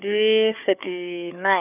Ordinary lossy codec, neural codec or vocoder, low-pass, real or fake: none; codec, 16 kHz, 4 kbps, FunCodec, trained on Chinese and English, 50 frames a second; 3.6 kHz; fake